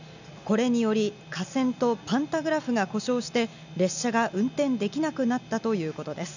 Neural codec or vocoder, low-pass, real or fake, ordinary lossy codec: none; 7.2 kHz; real; none